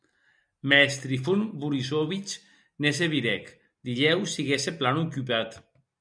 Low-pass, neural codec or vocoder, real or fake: 9.9 kHz; none; real